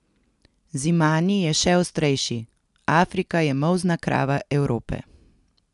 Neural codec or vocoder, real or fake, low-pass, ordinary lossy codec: none; real; 10.8 kHz; none